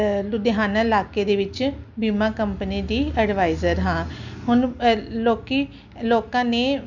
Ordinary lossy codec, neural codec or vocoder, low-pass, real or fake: none; none; 7.2 kHz; real